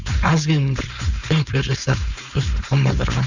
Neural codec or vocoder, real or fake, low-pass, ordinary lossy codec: codec, 16 kHz, 4.8 kbps, FACodec; fake; 7.2 kHz; Opus, 64 kbps